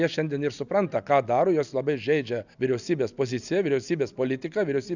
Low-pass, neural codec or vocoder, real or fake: 7.2 kHz; none; real